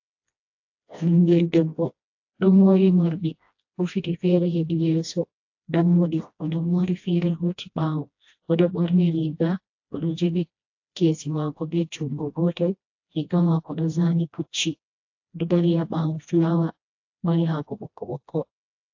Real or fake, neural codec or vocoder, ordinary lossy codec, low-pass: fake; codec, 16 kHz, 1 kbps, FreqCodec, smaller model; AAC, 48 kbps; 7.2 kHz